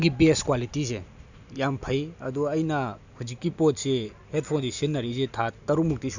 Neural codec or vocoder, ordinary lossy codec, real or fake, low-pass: none; none; real; 7.2 kHz